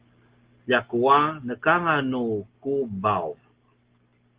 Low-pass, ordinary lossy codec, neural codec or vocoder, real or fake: 3.6 kHz; Opus, 16 kbps; none; real